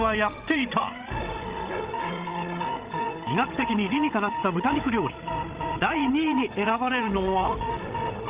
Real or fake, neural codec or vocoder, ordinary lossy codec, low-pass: fake; codec, 16 kHz, 16 kbps, FreqCodec, larger model; Opus, 24 kbps; 3.6 kHz